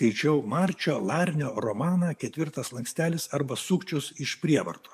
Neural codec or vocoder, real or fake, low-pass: codec, 44.1 kHz, 7.8 kbps, DAC; fake; 14.4 kHz